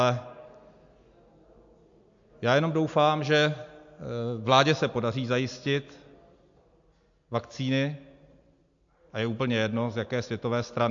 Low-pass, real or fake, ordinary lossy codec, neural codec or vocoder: 7.2 kHz; real; AAC, 64 kbps; none